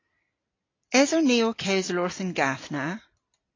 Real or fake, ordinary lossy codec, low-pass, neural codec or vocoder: real; AAC, 32 kbps; 7.2 kHz; none